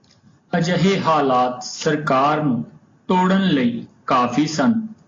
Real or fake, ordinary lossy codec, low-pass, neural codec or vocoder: real; AAC, 32 kbps; 7.2 kHz; none